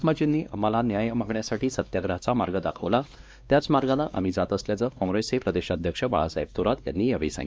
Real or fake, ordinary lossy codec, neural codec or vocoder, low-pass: fake; none; codec, 16 kHz, 2 kbps, X-Codec, WavLM features, trained on Multilingual LibriSpeech; none